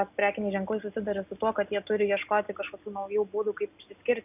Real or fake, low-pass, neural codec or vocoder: real; 3.6 kHz; none